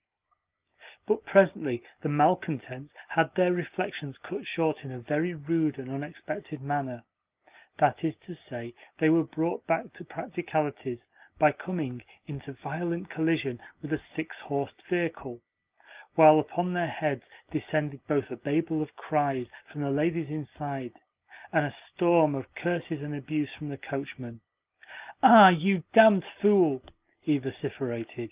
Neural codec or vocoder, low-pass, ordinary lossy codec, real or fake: none; 3.6 kHz; Opus, 32 kbps; real